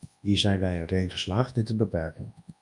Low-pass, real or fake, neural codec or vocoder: 10.8 kHz; fake; codec, 24 kHz, 0.9 kbps, WavTokenizer, large speech release